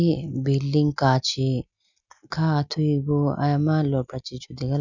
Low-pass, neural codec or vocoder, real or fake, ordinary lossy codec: 7.2 kHz; none; real; none